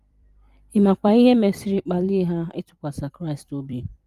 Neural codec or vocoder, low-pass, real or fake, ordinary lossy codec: vocoder, 44.1 kHz, 128 mel bands every 256 samples, BigVGAN v2; 14.4 kHz; fake; Opus, 32 kbps